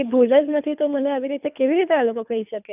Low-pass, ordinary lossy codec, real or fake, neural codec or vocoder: 3.6 kHz; none; fake; codec, 24 kHz, 3 kbps, HILCodec